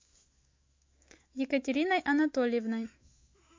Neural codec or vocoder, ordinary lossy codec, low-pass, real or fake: autoencoder, 48 kHz, 128 numbers a frame, DAC-VAE, trained on Japanese speech; MP3, 48 kbps; 7.2 kHz; fake